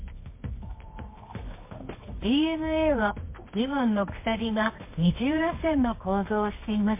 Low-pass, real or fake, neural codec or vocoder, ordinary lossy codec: 3.6 kHz; fake; codec, 24 kHz, 0.9 kbps, WavTokenizer, medium music audio release; MP3, 24 kbps